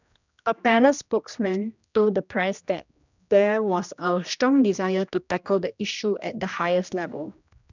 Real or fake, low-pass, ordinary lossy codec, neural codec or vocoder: fake; 7.2 kHz; none; codec, 16 kHz, 1 kbps, X-Codec, HuBERT features, trained on general audio